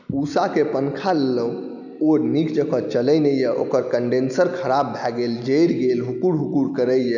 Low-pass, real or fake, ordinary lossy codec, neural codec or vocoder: 7.2 kHz; real; none; none